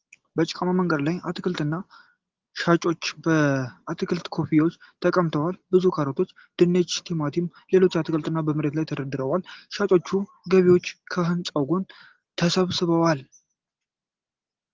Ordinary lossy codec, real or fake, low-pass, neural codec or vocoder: Opus, 16 kbps; real; 7.2 kHz; none